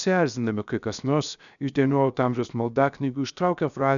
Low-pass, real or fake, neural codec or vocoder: 7.2 kHz; fake; codec, 16 kHz, 0.7 kbps, FocalCodec